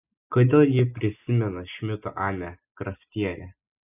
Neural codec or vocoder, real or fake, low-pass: none; real; 3.6 kHz